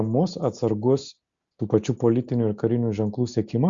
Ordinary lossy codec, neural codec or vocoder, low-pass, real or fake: Opus, 64 kbps; none; 7.2 kHz; real